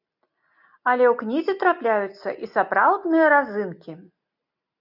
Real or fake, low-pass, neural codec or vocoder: real; 5.4 kHz; none